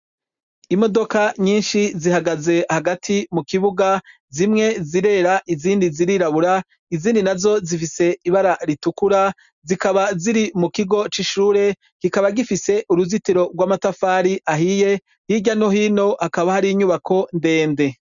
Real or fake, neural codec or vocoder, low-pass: real; none; 7.2 kHz